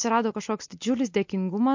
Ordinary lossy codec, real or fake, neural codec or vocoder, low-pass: MP3, 48 kbps; real; none; 7.2 kHz